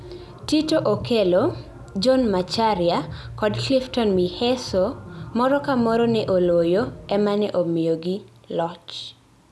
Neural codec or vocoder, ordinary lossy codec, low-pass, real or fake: none; none; none; real